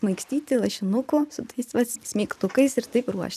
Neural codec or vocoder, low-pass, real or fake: vocoder, 44.1 kHz, 128 mel bands every 256 samples, BigVGAN v2; 14.4 kHz; fake